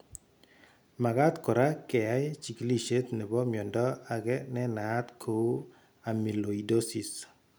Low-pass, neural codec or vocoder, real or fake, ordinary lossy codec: none; none; real; none